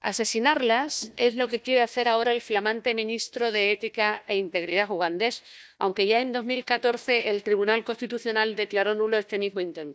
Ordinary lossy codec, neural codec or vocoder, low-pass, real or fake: none; codec, 16 kHz, 1 kbps, FunCodec, trained on Chinese and English, 50 frames a second; none; fake